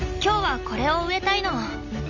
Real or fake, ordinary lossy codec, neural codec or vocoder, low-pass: real; none; none; 7.2 kHz